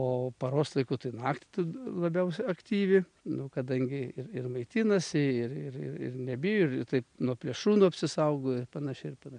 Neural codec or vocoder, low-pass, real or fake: none; 9.9 kHz; real